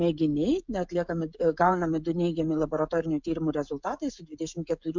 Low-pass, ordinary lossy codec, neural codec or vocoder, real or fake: 7.2 kHz; MP3, 64 kbps; codec, 16 kHz, 16 kbps, FreqCodec, smaller model; fake